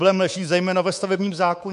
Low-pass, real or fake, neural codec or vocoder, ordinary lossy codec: 10.8 kHz; fake; codec, 24 kHz, 3.1 kbps, DualCodec; MP3, 64 kbps